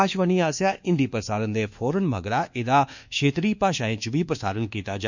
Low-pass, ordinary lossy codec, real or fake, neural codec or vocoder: 7.2 kHz; none; fake; codec, 24 kHz, 1.2 kbps, DualCodec